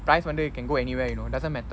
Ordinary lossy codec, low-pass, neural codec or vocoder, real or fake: none; none; none; real